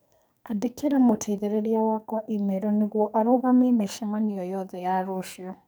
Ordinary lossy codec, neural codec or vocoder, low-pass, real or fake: none; codec, 44.1 kHz, 2.6 kbps, SNAC; none; fake